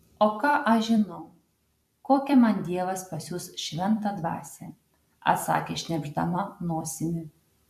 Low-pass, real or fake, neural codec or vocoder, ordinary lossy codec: 14.4 kHz; fake; vocoder, 44.1 kHz, 128 mel bands every 256 samples, BigVGAN v2; AAC, 96 kbps